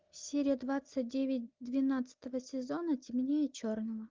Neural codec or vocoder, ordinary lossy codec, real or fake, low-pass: none; Opus, 24 kbps; real; 7.2 kHz